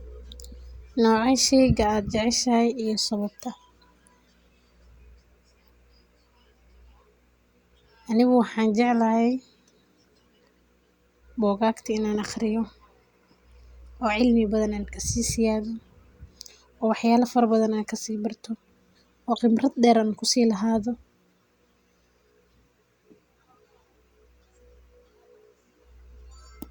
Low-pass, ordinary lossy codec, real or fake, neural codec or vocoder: 19.8 kHz; Opus, 64 kbps; real; none